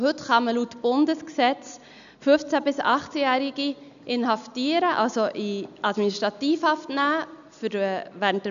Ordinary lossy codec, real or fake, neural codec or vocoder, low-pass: none; real; none; 7.2 kHz